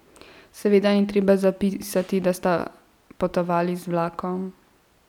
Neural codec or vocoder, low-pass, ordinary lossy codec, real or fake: none; 19.8 kHz; none; real